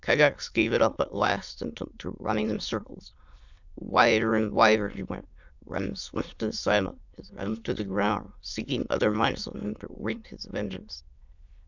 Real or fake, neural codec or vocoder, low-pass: fake; autoencoder, 22.05 kHz, a latent of 192 numbers a frame, VITS, trained on many speakers; 7.2 kHz